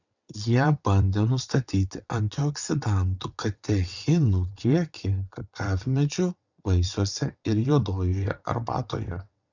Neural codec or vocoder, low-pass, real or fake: vocoder, 22.05 kHz, 80 mel bands, WaveNeXt; 7.2 kHz; fake